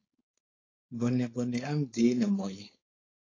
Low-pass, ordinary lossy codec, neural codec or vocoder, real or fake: 7.2 kHz; AAC, 32 kbps; codec, 16 kHz, 4.8 kbps, FACodec; fake